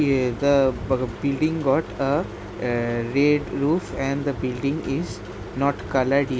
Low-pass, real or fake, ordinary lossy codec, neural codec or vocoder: none; real; none; none